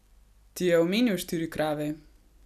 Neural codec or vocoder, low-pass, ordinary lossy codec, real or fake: none; 14.4 kHz; none; real